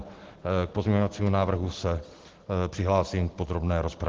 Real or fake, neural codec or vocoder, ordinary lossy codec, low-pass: real; none; Opus, 16 kbps; 7.2 kHz